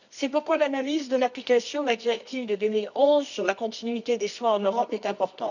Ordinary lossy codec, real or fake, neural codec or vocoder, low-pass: none; fake; codec, 24 kHz, 0.9 kbps, WavTokenizer, medium music audio release; 7.2 kHz